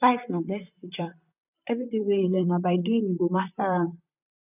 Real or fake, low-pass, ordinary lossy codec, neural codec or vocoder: fake; 3.6 kHz; none; vocoder, 44.1 kHz, 128 mel bands, Pupu-Vocoder